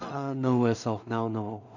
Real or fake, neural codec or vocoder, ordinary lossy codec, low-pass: fake; codec, 16 kHz in and 24 kHz out, 0.4 kbps, LongCat-Audio-Codec, two codebook decoder; none; 7.2 kHz